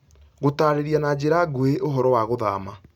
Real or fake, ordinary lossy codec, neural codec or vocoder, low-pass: real; none; none; 19.8 kHz